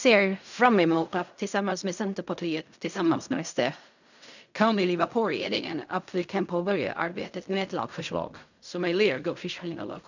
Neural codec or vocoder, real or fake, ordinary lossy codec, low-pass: codec, 16 kHz in and 24 kHz out, 0.4 kbps, LongCat-Audio-Codec, fine tuned four codebook decoder; fake; none; 7.2 kHz